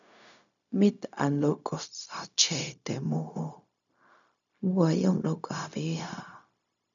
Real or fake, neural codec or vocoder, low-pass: fake; codec, 16 kHz, 0.4 kbps, LongCat-Audio-Codec; 7.2 kHz